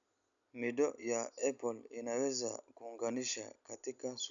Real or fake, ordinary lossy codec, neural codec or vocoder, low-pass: real; none; none; 7.2 kHz